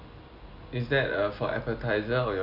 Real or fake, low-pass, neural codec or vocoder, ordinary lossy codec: real; 5.4 kHz; none; none